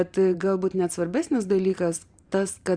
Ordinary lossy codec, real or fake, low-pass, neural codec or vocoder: Opus, 64 kbps; fake; 9.9 kHz; vocoder, 44.1 kHz, 128 mel bands every 512 samples, BigVGAN v2